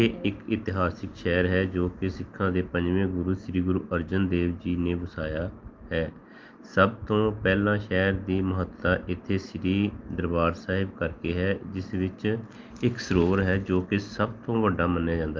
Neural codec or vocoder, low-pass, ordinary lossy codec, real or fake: none; 7.2 kHz; Opus, 32 kbps; real